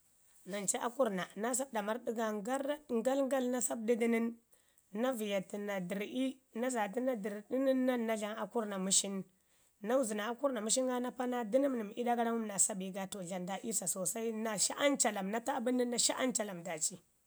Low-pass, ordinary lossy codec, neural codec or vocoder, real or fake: none; none; none; real